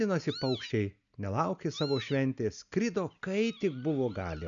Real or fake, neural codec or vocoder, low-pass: real; none; 7.2 kHz